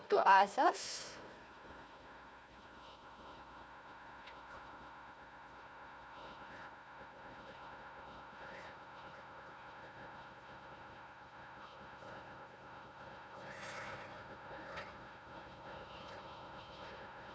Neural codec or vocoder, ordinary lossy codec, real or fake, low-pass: codec, 16 kHz, 1 kbps, FunCodec, trained on Chinese and English, 50 frames a second; none; fake; none